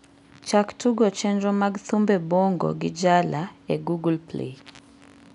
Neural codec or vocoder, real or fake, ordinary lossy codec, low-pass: none; real; none; 10.8 kHz